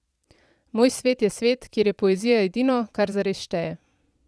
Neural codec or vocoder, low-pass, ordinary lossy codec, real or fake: vocoder, 22.05 kHz, 80 mel bands, Vocos; none; none; fake